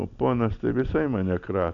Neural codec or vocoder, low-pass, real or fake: none; 7.2 kHz; real